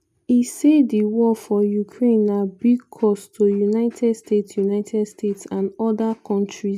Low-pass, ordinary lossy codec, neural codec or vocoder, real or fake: 14.4 kHz; none; none; real